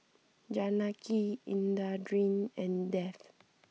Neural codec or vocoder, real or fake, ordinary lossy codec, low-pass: none; real; none; none